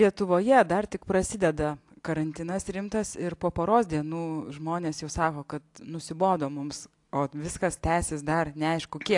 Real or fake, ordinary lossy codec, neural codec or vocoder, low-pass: real; AAC, 64 kbps; none; 10.8 kHz